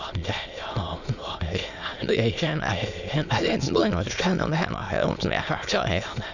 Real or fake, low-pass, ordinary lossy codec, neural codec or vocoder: fake; 7.2 kHz; none; autoencoder, 22.05 kHz, a latent of 192 numbers a frame, VITS, trained on many speakers